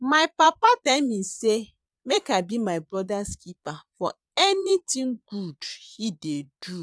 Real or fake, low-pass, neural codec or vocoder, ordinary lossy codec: fake; none; vocoder, 22.05 kHz, 80 mel bands, Vocos; none